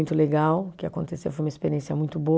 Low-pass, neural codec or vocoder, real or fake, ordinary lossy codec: none; none; real; none